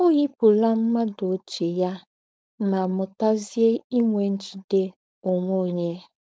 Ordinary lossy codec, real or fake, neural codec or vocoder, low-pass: none; fake; codec, 16 kHz, 4.8 kbps, FACodec; none